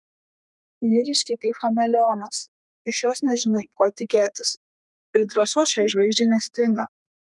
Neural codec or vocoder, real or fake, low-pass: codec, 32 kHz, 1.9 kbps, SNAC; fake; 10.8 kHz